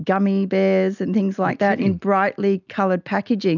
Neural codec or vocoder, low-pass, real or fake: none; 7.2 kHz; real